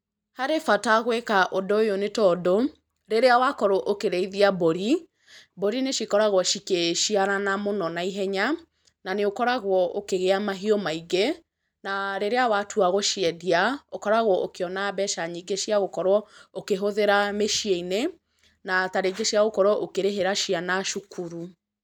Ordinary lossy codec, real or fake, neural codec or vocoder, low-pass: none; real; none; 19.8 kHz